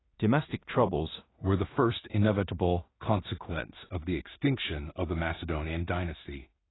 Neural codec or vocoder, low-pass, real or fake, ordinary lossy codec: codec, 16 kHz in and 24 kHz out, 0.4 kbps, LongCat-Audio-Codec, two codebook decoder; 7.2 kHz; fake; AAC, 16 kbps